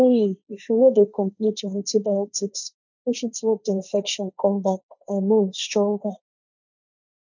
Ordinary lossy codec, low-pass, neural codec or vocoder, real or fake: none; 7.2 kHz; codec, 16 kHz, 1.1 kbps, Voila-Tokenizer; fake